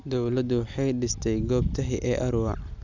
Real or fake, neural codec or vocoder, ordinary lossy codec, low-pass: real; none; none; 7.2 kHz